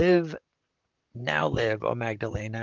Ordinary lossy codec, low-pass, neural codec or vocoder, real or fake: Opus, 32 kbps; 7.2 kHz; vocoder, 44.1 kHz, 128 mel bands, Pupu-Vocoder; fake